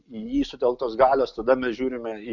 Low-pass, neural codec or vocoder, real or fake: 7.2 kHz; none; real